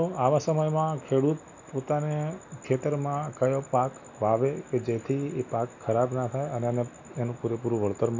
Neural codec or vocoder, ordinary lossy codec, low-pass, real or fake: none; none; 7.2 kHz; real